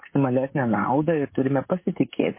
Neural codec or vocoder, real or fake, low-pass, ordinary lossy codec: codec, 16 kHz, 16 kbps, FreqCodec, smaller model; fake; 3.6 kHz; MP3, 24 kbps